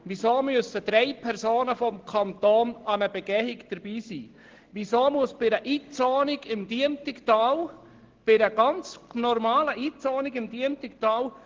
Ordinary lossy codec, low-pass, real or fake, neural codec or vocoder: Opus, 16 kbps; 7.2 kHz; real; none